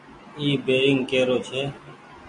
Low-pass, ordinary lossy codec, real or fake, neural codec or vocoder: 10.8 kHz; MP3, 48 kbps; real; none